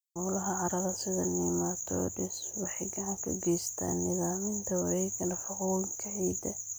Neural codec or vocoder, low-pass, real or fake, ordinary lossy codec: none; none; real; none